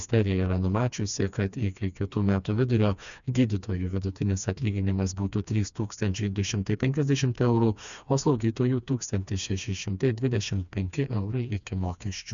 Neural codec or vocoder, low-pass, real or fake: codec, 16 kHz, 2 kbps, FreqCodec, smaller model; 7.2 kHz; fake